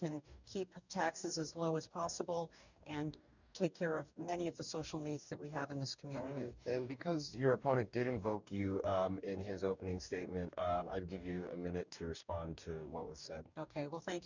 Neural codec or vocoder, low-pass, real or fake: codec, 44.1 kHz, 2.6 kbps, DAC; 7.2 kHz; fake